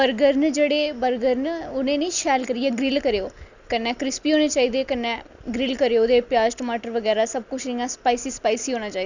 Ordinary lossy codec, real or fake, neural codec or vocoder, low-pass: Opus, 64 kbps; real; none; 7.2 kHz